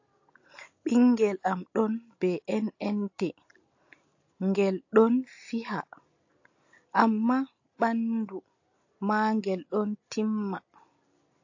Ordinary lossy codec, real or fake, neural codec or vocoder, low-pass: AAC, 48 kbps; real; none; 7.2 kHz